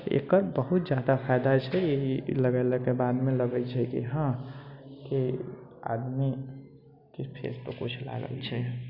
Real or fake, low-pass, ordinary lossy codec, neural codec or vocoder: real; 5.4 kHz; none; none